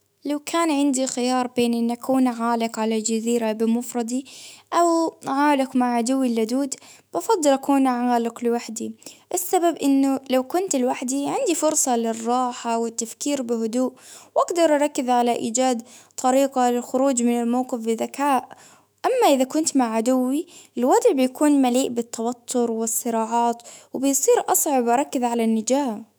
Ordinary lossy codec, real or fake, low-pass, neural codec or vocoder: none; fake; none; autoencoder, 48 kHz, 128 numbers a frame, DAC-VAE, trained on Japanese speech